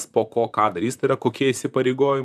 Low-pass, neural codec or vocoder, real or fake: 14.4 kHz; vocoder, 44.1 kHz, 128 mel bands, Pupu-Vocoder; fake